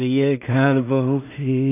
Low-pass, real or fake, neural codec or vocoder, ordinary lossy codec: 3.6 kHz; fake; codec, 16 kHz in and 24 kHz out, 0.4 kbps, LongCat-Audio-Codec, two codebook decoder; none